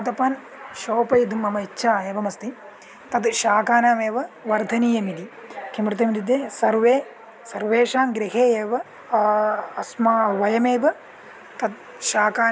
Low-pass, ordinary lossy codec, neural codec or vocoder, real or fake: none; none; none; real